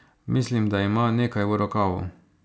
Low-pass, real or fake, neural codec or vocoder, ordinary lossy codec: none; real; none; none